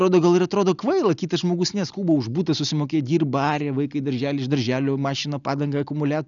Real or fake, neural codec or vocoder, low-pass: real; none; 7.2 kHz